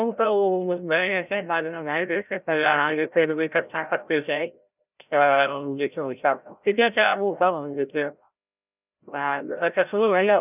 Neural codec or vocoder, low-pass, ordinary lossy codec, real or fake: codec, 16 kHz, 0.5 kbps, FreqCodec, larger model; 3.6 kHz; none; fake